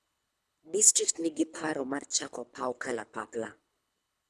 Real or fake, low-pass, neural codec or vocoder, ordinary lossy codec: fake; none; codec, 24 kHz, 3 kbps, HILCodec; none